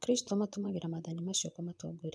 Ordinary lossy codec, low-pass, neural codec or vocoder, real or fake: none; none; vocoder, 22.05 kHz, 80 mel bands, Vocos; fake